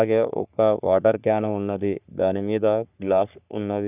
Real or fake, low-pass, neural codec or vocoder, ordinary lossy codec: fake; 3.6 kHz; codec, 44.1 kHz, 3.4 kbps, Pupu-Codec; none